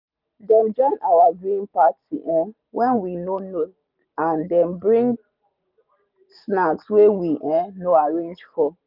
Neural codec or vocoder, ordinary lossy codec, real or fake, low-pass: none; none; real; 5.4 kHz